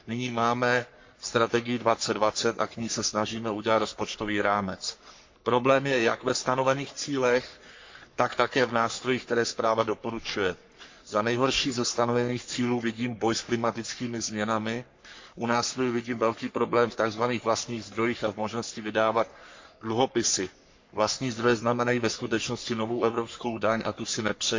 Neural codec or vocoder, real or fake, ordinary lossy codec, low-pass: codec, 44.1 kHz, 3.4 kbps, Pupu-Codec; fake; MP3, 48 kbps; 7.2 kHz